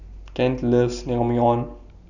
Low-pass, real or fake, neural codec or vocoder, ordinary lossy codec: 7.2 kHz; real; none; none